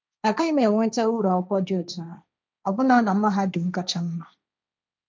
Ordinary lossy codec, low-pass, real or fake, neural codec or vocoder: none; none; fake; codec, 16 kHz, 1.1 kbps, Voila-Tokenizer